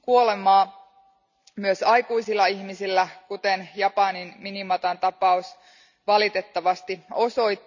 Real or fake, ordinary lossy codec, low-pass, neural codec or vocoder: real; none; 7.2 kHz; none